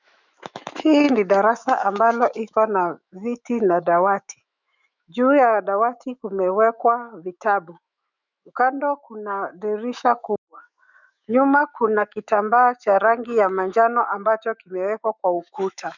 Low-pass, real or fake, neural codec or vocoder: 7.2 kHz; fake; autoencoder, 48 kHz, 128 numbers a frame, DAC-VAE, trained on Japanese speech